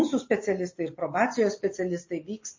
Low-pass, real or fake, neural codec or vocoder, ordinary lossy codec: 7.2 kHz; real; none; MP3, 32 kbps